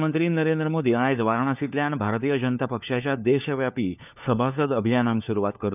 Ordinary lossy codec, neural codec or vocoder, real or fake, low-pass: none; codec, 16 kHz, 4 kbps, FunCodec, trained on LibriTTS, 50 frames a second; fake; 3.6 kHz